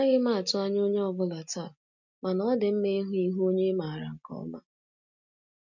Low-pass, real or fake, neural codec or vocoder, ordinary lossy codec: 7.2 kHz; real; none; none